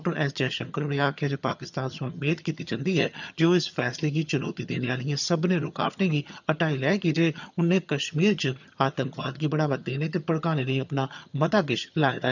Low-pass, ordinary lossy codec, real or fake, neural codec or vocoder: 7.2 kHz; none; fake; vocoder, 22.05 kHz, 80 mel bands, HiFi-GAN